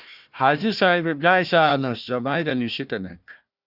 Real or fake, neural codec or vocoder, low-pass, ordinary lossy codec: fake; codec, 16 kHz, 1 kbps, FunCodec, trained on Chinese and English, 50 frames a second; 5.4 kHz; Opus, 64 kbps